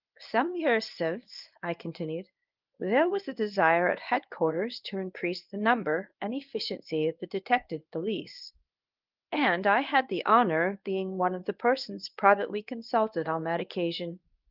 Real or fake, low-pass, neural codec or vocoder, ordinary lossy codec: fake; 5.4 kHz; codec, 24 kHz, 0.9 kbps, WavTokenizer, medium speech release version 1; Opus, 32 kbps